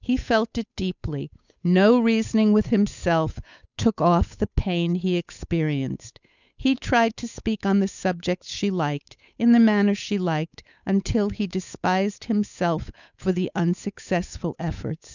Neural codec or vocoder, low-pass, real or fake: codec, 16 kHz, 8 kbps, FunCodec, trained on Chinese and English, 25 frames a second; 7.2 kHz; fake